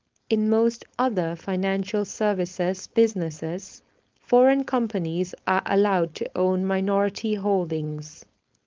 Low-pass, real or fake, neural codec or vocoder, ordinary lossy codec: 7.2 kHz; fake; codec, 16 kHz, 4.8 kbps, FACodec; Opus, 32 kbps